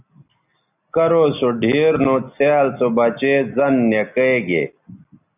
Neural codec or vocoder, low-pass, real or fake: none; 3.6 kHz; real